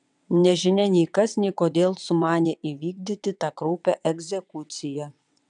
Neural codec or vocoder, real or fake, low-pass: vocoder, 22.05 kHz, 80 mel bands, WaveNeXt; fake; 9.9 kHz